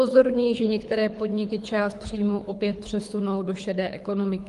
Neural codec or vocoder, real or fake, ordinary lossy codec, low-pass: codec, 24 kHz, 3 kbps, HILCodec; fake; Opus, 32 kbps; 10.8 kHz